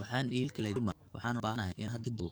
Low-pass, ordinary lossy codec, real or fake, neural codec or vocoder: none; none; fake; codec, 44.1 kHz, 7.8 kbps, DAC